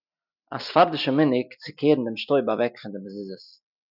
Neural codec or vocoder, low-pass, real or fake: none; 5.4 kHz; real